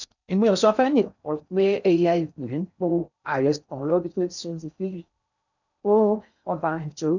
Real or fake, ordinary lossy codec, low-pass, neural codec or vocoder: fake; none; 7.2 kHz; codec, 16 kHz in and 24 kHz out, 0.6 kbps, FocalCodec, streaming, 4096 codes